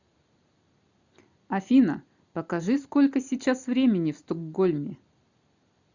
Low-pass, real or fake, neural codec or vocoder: 7.2 kHz; fake; vocoder, 44.1 kHz, 128 mel bands every 256 samples, BigVGAN v2